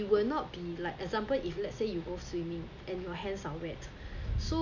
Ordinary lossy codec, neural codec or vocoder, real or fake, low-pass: none; none; real; 7.2 kHz